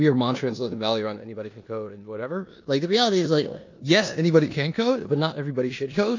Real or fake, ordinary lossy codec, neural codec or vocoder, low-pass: fake; AAC, 48 kbps; codec, 16 kHz in and 24 kHz out, 0.9 kbps, LongCat-Audio-Codec, four codebook decoder; 7.2 kHz